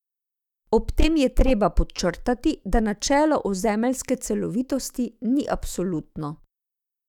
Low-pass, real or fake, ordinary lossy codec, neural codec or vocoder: 19.8 kHz; fake; none; autoencoder, 48 kHz, 128 numbers a frame, DAC-VAE, trained on Japanese speech